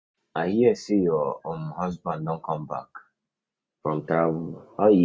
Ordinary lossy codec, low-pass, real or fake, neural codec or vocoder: none; none; real; none